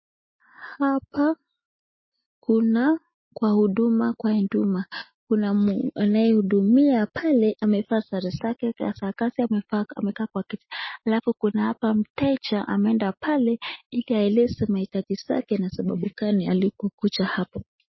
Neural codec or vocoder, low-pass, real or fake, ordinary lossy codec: none; 7.2 kHz; real; MP3, 24 kbps